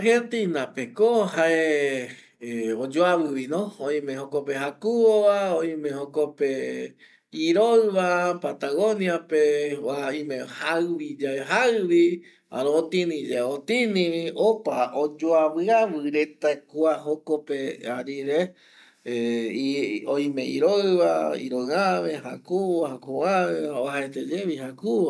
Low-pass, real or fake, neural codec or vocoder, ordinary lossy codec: none; real; none; none